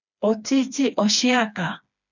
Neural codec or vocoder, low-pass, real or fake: codec, 16 kHz, 2 kbps, FreqCodec, smaller model; 7.2 kHz; fake